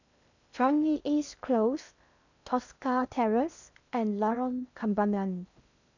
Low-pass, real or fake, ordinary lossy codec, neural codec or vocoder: 7.2 kHz; fake; none; codec, 16 kHz in and 24 kHz out, 0.6 kbps, FocalCodec, streaming, 2048 codes